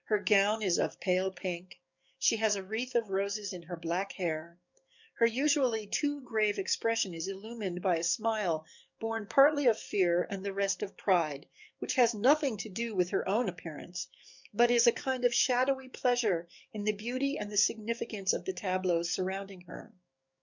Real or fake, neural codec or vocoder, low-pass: fake; codec, 44.1 kHz, 7.8 kbps, DAC; 7.2 kHz